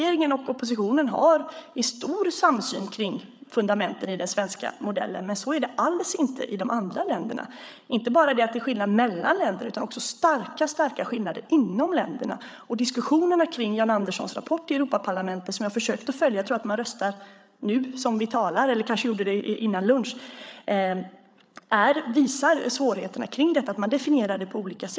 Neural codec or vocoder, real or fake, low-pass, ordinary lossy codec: codec, 16 kHz, 8 kbps, FreqCodec, larger model; fake; none; none